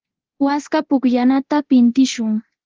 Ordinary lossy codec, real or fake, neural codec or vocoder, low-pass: Opus, 16 kbps; fake; codec, 24 kHz, 0.9 kbps, DualCodec; 7.2 kHz